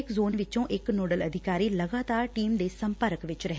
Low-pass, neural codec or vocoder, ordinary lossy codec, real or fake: none; none; none; real